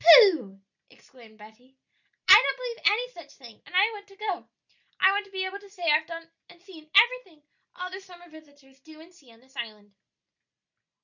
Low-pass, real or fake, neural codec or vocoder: 7.2 kHz; real; none